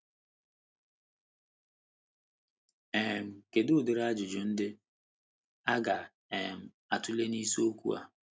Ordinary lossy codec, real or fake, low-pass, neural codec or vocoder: none; real; none; none